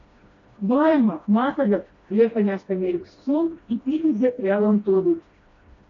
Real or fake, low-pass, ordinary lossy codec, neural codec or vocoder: fake; 7.2 kHz; AAC, 32 kbps; codec, 16 kHz, 1 kbps, FreqCodec, smaller model